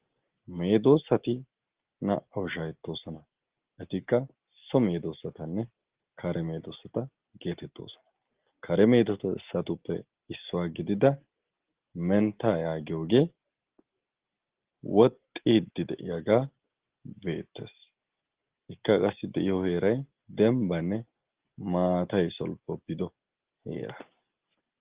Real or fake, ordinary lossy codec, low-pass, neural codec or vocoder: real; Opus, 16 kbps; 3.6 kHz; none